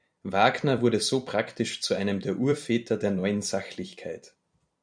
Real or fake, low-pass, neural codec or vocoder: fake; 9.9 kHz; vocoder, 44.1 kHz, 128 mel bands every 256 samples, BigVGAN v2